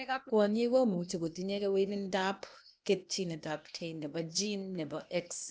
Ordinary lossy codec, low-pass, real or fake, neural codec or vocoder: none; none; fake; codec, 16 kHz, 0.8 kbps, ZipCodec